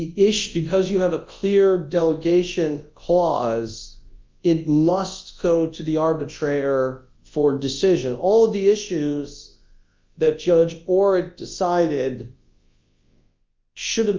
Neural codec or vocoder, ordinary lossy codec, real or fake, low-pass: codec, 24 kHz, 0.9 kbps, WavTokenizer, large speech release; Opus, 24 kbps; fake; 7.2 kHz